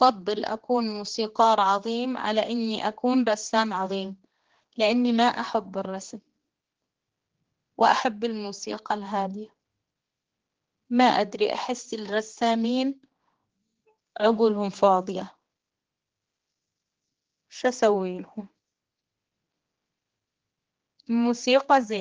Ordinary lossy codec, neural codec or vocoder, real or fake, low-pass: Opus, 16 kbps; codec, 16 kHz, 2 kbps, X-Codec, HuBERT features, trained on general audio; fake; 7.2 kHz